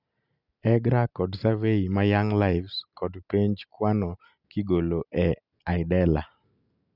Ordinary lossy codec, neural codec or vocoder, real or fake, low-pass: none; none; real; 5.4 kHz